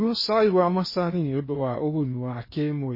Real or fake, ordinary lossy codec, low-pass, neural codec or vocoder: fake; MP3, 24 kbps; 5.4 kHz; codec, 16 kHz in and 24 kHz out, 0.8 kbps, FocalCodec, streaming, 65536 codes